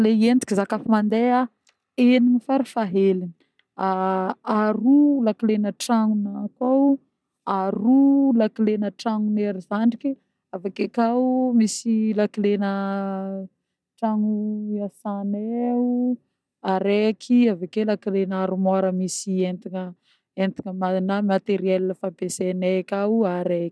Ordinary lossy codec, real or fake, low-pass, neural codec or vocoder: none; real; none; none